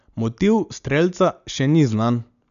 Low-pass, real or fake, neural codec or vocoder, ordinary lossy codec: 7.2 kHz; real; none; none